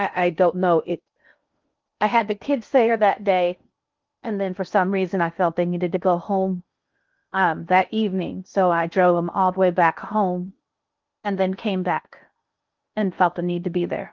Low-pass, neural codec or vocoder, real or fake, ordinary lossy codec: 7.2 kHz; codec, 16 kHz in and 24 kHz out, 0.6 kbps, FocalCodec, streaming, 2048 codes; fake; Opus, 32 kbps